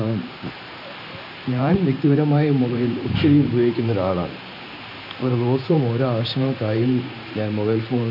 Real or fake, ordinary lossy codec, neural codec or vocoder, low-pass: fake; none; vocoder, 44.1 kHz, 128 mel bands every 512 samples, BigVGAN v2; 5.4 kHz